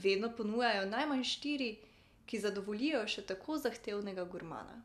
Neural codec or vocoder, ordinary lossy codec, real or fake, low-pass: none; none; real; 14.4 kHz